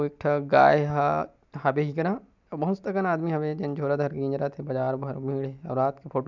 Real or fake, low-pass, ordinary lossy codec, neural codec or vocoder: real; 7.2 kHz; none; none